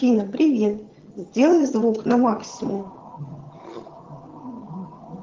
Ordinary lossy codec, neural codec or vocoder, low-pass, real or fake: Opus, 16 kbps; vocoder, 22.05 kHz, 80 mel bands, HiFi-GAN; 7.2 kHz; fake